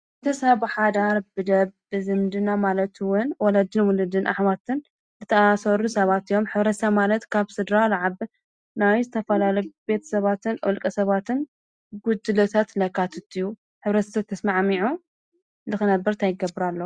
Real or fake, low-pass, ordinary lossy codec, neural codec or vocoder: real; 9.9 kHz; Opus, 64 kbps; none